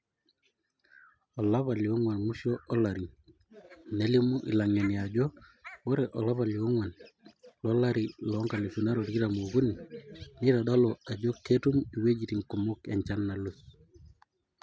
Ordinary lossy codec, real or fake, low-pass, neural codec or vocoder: none; real; none; none